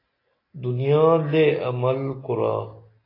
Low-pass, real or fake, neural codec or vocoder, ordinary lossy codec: 5.4 kHz; real; none; AAC, 24 kbps